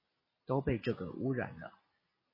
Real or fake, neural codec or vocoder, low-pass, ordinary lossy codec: real; none; 5.4 kHz; MP3, 24 kbps